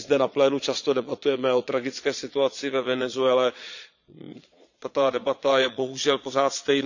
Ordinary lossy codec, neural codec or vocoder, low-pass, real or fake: none; vocoder, 22.05 kHz, 80 mel bands, Vocos; 7.2 kHz; fake